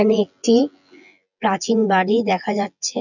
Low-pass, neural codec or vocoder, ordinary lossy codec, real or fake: 7.2 kHz; vocoder, 24 kHz, 100 mel bands, Vocos; none; fake